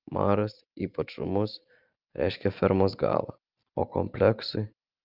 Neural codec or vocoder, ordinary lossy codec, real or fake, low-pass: none; Opus, 24 kbps; real; 5.4 kHz